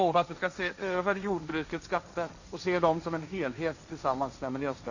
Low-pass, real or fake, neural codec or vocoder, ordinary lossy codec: 7.2 kHz; fake; codec, 16 kHz, 1.1 kbps, Voila-Tokenizer; none